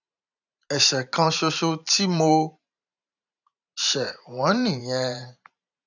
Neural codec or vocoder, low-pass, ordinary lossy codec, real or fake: vocoder, 44.1 kHz, 128 mel bands every 512 samples, BigVGAN v2; 7.2 kHz; none; fake